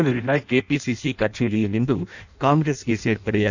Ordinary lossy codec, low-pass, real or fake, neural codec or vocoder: none; 7.2 kHz; fake; codec, 16 kHz in and 24 kHz out, 0.6 kbps, FireRedTTS-2 codec